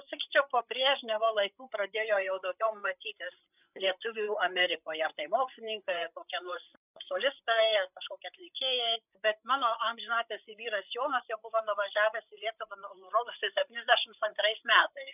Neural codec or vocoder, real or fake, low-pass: codec, 16 kHz, 8 kbps, FreqCodec, larger model; fake; 3.6 kHz